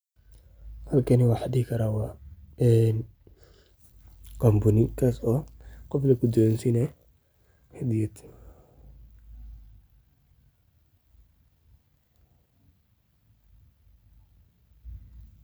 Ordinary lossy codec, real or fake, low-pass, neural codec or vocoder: none; real; none; none